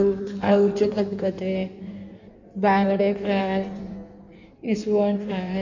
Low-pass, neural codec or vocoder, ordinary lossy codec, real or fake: 7.2 kHz; codec, 16 kHz in and 24 kHz out, 1.1 kbps, FireRedTTS-2 codec; none; fake